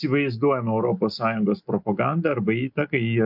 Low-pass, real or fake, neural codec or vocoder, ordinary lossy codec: 5.4 kHz; real; none; MP3, 48 kbps